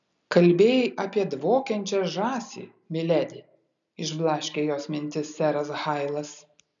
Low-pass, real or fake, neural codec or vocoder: 7.2 kHz; real; none